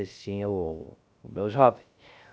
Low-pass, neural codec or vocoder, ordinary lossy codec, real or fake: none; codec, 16 kHz, 0.3 kbps, FocalCodec; none; fake